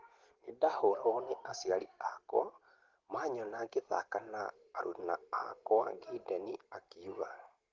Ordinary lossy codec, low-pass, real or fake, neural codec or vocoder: Opus, 16 kbps; 7.2 kHz; real; none